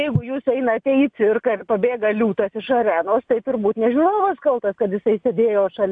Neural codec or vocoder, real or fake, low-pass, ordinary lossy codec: none; real; 9.9 kHz; AAC, 64 kbps